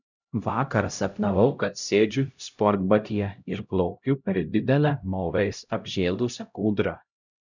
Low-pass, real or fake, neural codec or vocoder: 7.2 kHz; fake; codec, 16 kHz, 0.5 kbps, X-Codec, HuBERT features, trained on LibriSpeech